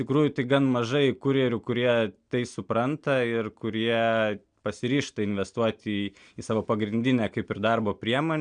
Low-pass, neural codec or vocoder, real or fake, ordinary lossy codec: 9.9 kHz; none; real; MP3, 96 kbps